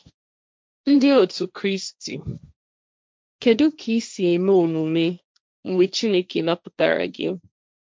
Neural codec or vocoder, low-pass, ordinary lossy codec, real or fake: codec, 16 kHz, 1.1 kbps, Voila-Tokenizer; none; none; fake